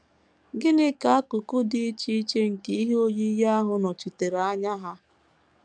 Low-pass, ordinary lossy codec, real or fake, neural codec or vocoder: 9.9 kHz; MP3, 96 kbps; fake; codec, 44.1 kHz, 7.8 kbps, DAC